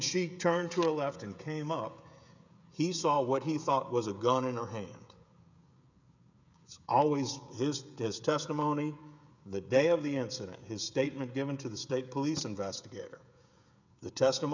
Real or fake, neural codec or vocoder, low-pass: fake; codec, 16 kHz, 16 kbps, FreqCodec, smaller model; 7.2 kHz